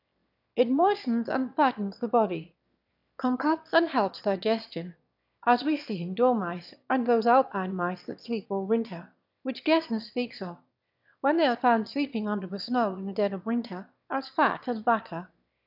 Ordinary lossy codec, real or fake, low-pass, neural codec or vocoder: AAC, 48 kbps; fake; 5.4 kHz; autoencoder, 22.05 kHz, a latent of 192 numbers a frame, VITS, trained on one speaker